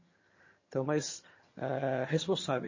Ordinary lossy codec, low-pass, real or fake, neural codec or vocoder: MP3, 32 kbps; 7.2 kHz; fake; vocoder, 22.05 kHz, 80 mel bands, HiFi-GAN